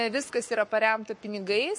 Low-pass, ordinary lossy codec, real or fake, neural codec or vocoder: 10.8 kHz; MP3, 48 kbps; fake; codec, 44.1 kHz, 7.8 kbps, Pupu-Codec